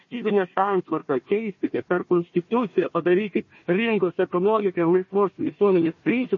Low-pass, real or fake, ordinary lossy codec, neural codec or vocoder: 7.2 kHz; fake; MP3, 32 kbps; codec, 16 kHz, 1 kbps, FunCodec, trained on Chinese and English, 50 frames a second